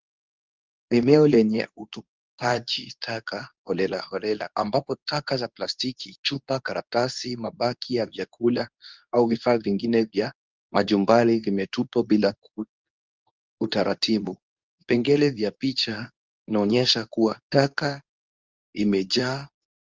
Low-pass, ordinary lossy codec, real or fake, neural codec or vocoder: 7.2 kHz; Opus, 24 kbps; fake; codec, 24 kHz, 0.9 kbps, WavTokenizer, medium speech release version 2